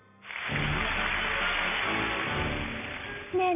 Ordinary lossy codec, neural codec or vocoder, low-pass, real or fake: none; none; 3.6 kHz; real